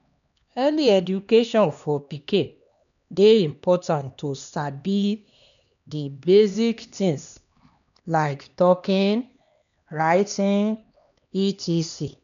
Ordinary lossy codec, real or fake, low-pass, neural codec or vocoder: MP3, 96 kbps; fake; 7.2 kHz; codec, 16 kHz, 2 kbps, X-Codec, HuBERT features, trained on LibriSpeech